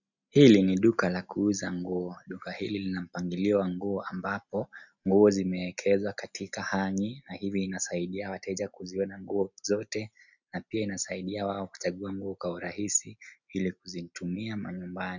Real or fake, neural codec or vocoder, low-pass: real; none; 7.2 kHz